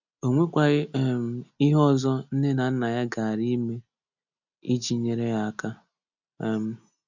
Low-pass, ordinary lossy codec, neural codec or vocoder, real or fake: 7.2 kHz; none; none; real